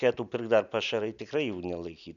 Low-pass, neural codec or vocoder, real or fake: 7.2 kHz; none; real